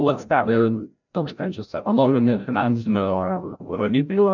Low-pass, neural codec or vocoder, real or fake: 7.2 kHz; codec, 16 kHz, 0.5 kbps, FreqCodec, larger model; fake